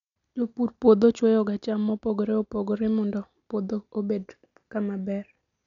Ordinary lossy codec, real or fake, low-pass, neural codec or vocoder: none; real; 7.2 kHz; none